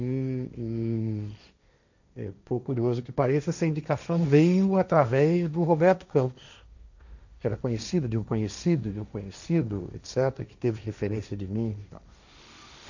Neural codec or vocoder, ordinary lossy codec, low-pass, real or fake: codec, 16 kHz, 1.1 kbps, Voila-Tokenizer; none; 7.2 kHz; fake